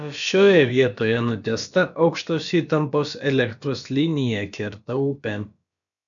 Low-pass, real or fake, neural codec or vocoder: 7.2 kHz; fake; codec, 16 kHz, about 1 kbps, DyCAST, with the encoder's durations